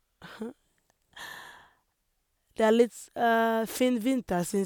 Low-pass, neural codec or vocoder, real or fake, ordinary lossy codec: none; none; real; none